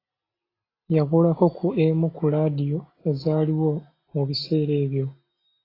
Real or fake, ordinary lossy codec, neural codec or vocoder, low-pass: real; AAC, 24 kbps; none; 5.4 kHz